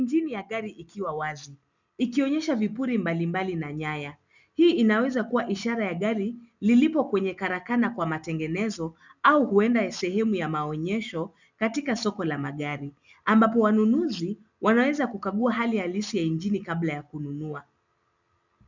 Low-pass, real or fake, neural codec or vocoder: 7.2 kHz; real; none